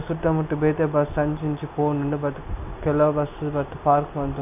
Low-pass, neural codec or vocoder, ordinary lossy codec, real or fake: 3.6 kHz; none; none; real